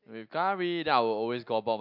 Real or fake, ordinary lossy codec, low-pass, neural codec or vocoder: real; MP3, 48 kbps; 5.4 kHz; none